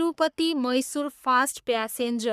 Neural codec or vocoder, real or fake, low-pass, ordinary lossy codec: codec, 44.1 kHz, 3.4 kbps, Pupu-Codec; fake; 14.4 kHz; none